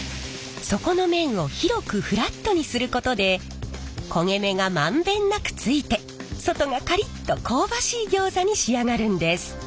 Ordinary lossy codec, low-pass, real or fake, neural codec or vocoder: none; none; real; none